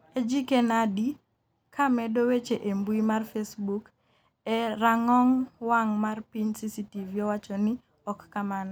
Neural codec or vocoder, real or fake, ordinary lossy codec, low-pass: none; real; none; none